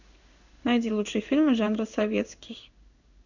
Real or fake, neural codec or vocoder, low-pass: fake; codec, 16 kHz in and 24 kHz out, 1 kbps, XY-Tokenizer; 7.2 kHz